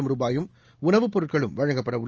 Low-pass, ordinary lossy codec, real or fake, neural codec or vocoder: 7.2 kHz; Opus, 24 kbps; real; none